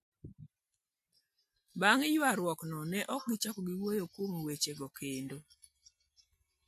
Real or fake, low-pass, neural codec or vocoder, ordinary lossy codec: real; 14.4 kHz; none; MP3, 64 kbps